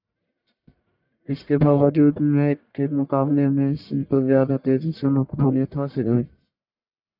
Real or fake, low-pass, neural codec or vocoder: fake; 5.4 kHz; codec, 44.1 kHz, 1.7 kbps, Pupu-Codec